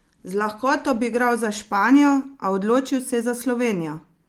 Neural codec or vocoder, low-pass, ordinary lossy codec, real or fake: none; 19.8 kHz; Opus, 24 kbps; real